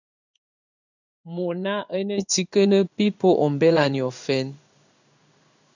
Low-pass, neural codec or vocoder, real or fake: 7.2 kHz; codec, 16 kHz in and 24 kHz out, 1 kbps, XY-Tokenizer; fake